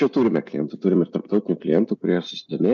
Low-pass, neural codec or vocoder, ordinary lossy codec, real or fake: 7.2 kHz; none; MP3, 64 kbps; real